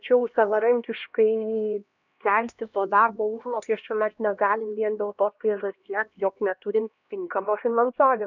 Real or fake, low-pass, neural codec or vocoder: fake; 7.2 kHz; codec, 16 kHz, 1 kbps, X-Codec, HuBERT features, trained on LibriSpeech